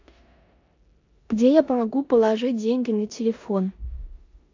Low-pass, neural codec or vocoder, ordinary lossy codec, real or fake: 7.2 kHz; codec, 16 kHz in and 24 kHz out, 0.9 kbps, LongCat-Audio-Codec, four codebook decoder; AAC, 48 kbps; fake